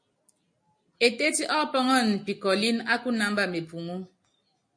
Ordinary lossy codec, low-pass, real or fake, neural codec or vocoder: MP3, 48 kbps; 9.9 kHz; real; none